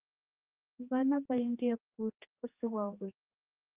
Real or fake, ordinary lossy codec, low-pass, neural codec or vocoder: fake; Opus, 24 kbps; 3.6 kHz; codec, 16 kHz, 2 kbps, X-Codec, HuBERT features, trained on general audio